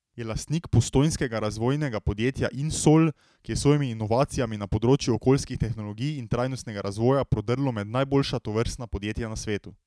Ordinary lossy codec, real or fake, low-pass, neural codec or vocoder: none; real; none; none